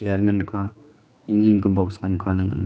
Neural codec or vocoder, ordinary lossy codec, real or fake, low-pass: codec, 16 kHz, 2 kbps, X-Codec, HuBERT features, trained on general audio; none; fake; none